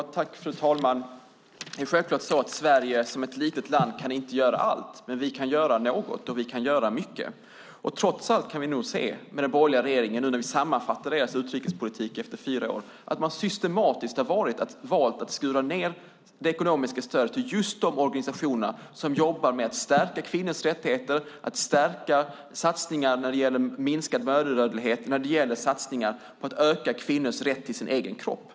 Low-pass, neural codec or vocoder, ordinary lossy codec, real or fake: none; none; none; real